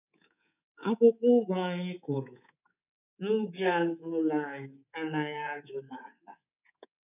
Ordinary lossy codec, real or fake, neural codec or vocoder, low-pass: none; fake; codec, 24 kHz, 3.1 kbps, DualCodec; 3.6 kHz